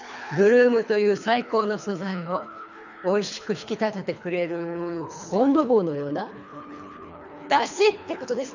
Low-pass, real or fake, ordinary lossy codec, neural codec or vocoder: 7.2 kHz; fake; none; codec, 24 kHz, 3 kbps, HILCodec